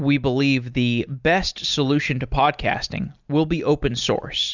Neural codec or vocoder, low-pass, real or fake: none; 7.2 kHz; real